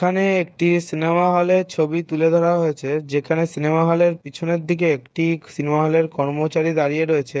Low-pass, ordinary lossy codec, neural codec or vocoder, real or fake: none; none; codec, 16 kHz, 8 kbps, FreqCodec, smaller model; fake